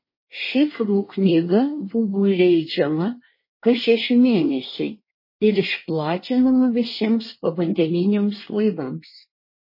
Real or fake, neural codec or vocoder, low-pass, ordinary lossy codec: fake; codec, 24 kHz, 1 kbps, SNAC; 5.4 kHz; MP3, 24 kbps